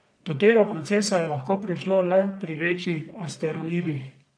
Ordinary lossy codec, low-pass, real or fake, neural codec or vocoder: none; 9.9 kHz; fake; codec, 44.1 kHz, 1.7 kbps, Pupu-Codec